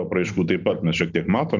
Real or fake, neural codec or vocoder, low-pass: real; none; 7.2 kHz